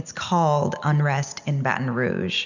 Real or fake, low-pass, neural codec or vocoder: real; 7.2 kHz; none